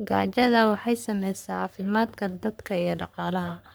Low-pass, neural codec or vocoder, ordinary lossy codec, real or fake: none; codec, 44.1 kHz, 3.4 kbps, Pupu-Codec; none; fake